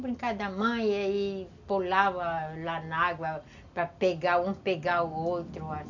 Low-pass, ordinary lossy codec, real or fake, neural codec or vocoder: 7.2 kHz; none; real; none